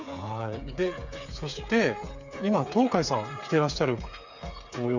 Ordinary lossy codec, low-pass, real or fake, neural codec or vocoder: none; 7.2 kHz; fake; codec, 16 kHz, 8 kbps, FreqCodec, smaller model